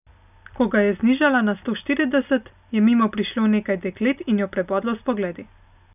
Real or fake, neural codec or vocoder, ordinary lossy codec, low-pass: real; none; none; 3.6 kHz